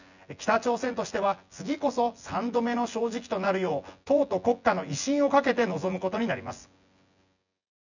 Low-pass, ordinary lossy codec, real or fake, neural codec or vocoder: 7.2 kHz; none; fake; vocoder, 24 kHz, 100 mel bands, Vocos